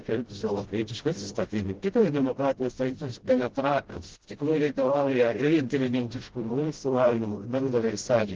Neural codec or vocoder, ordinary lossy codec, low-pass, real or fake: codec, 16 kHz, 0.5 kbps, FreqCodec, smaller model; Opus, 16 kbps; 7.2 kHz; fake